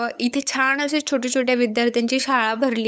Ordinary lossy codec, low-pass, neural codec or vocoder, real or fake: none; none; codec, 16 kHz, 8 kbps, FunCodec, trained on LibriTTS, 25 frames a second; fake